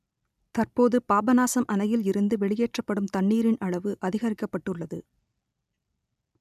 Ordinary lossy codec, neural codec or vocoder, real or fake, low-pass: none; none; real; 14.4 kHz